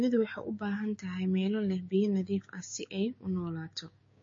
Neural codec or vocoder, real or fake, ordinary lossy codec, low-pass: codec, 16 kHz, 6 kbps, DAC; fake; MP3, 32 kbps; 7.2 kHz